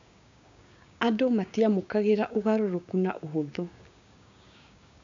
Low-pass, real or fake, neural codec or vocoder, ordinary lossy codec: 7.2 kHz; fake; codec, 16 kHz, 6 kbps, DAC; MP3, 64 kbps